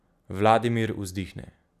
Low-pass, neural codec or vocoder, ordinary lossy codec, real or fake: 14.4 kHz; none; none; real